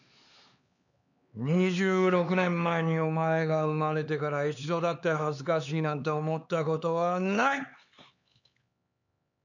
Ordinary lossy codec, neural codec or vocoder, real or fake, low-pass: none; codec, 16 kHz, 4 kbps, X-Codec, HuBERT features, trained on LibriSpeech; fake; 7.2 kHz